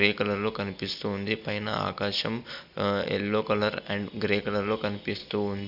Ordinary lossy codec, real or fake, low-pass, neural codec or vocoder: none; real; 5.4 kHz; none